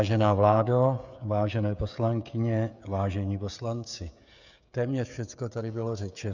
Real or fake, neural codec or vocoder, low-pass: fake; codec, 16 kHz, 16 kbps, FreqCodec, smaller model; 7.2 kHz